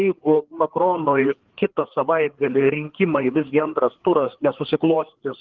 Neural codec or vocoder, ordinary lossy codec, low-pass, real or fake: codec, 16 kHz, 4 kbps, FreqCodec, larger model; Opus, 16 kbps; 7.2 kHz; fake